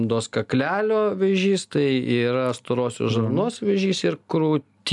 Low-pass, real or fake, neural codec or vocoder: 10.8 kHz; real; none